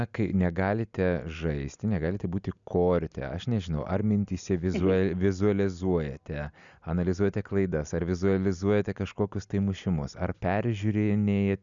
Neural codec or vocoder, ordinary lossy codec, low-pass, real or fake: none; MP3, 96 kbps; 7.2 kHz; real